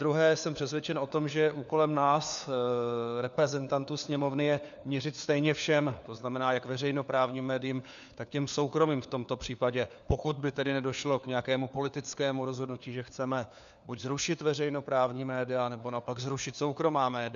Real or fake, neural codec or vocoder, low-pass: fake; codec, 16 kHz, 4 kbps, FunCodec, trained on LibriTTS, 50 frames a second; 7.2 kHz